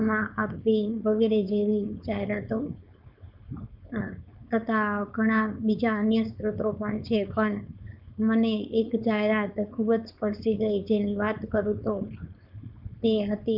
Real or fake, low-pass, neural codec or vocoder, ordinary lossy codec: fake; 5.4 kHz; codec, 16 kHz, 4.8 kbps, FACodec; none